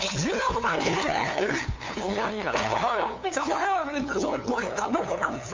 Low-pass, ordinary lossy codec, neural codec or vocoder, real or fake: 7.2 kHz; MP3, 48 kbps; codec, 16 kHz, 2 kbps, FunCodec, trained on LibriTTS, 25 frames a second; fake